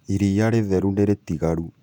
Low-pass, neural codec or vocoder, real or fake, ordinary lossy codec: 19.8 kHz; vocoder, 48 kHz, 128 mel bands, Vocos; fake; none